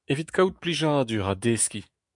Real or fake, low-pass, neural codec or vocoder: fake; 10.8 kHz; autoencoder, 48 kHz, 128 numbers a frame, DAC-VAE, trained on Japanese speech